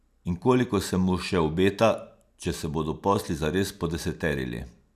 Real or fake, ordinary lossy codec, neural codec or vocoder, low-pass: real; none; none; 14.4 kHz